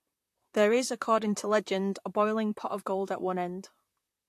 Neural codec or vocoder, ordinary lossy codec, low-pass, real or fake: vocoder, 44.1 kHz, 128 mel bands, Pupu-Vocoder; AAC, 64 kbps; 14.4 kHz; fake